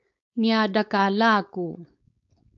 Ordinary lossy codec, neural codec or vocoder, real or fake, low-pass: AAC, 64 kbps; codec, 16 kHz, 4.8 kbps, FACodec; fake; 7.2 kHz